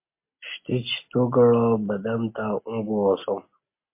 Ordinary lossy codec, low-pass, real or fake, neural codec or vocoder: MP3, 24 kbps; 3.6 kHz; real; none